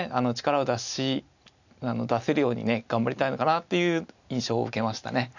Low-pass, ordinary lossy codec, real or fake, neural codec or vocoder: 7.2 kHz; none; real; none